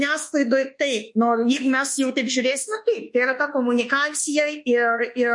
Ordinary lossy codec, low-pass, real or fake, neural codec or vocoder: MP3, 48 kbps; 10.8 kHz; fake; codec, 24 kHz, 1.2 kbps, DualCodec